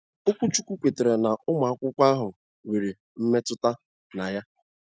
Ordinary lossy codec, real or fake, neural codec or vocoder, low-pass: none; real; none; none